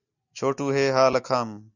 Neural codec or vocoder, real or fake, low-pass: none; real; 7.2 kHz